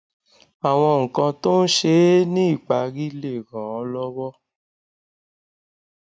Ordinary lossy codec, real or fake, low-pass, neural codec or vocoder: none; real; none; none